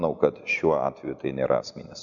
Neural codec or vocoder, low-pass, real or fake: none; 7.2 kHz; real